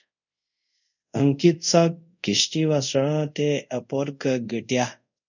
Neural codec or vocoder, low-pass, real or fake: codec, 24 kHz, 0.5 kbps, DualCodec; 7.2 kHz; fake